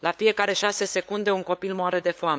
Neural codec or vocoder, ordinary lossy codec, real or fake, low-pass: codec, 16 kHz, 8 kbps, FunCodec, trained on LibriTTS, 25 frames a second; none; fake; none